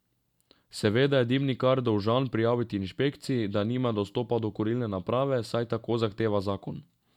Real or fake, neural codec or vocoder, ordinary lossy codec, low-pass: real; none; none; 19.8 kHz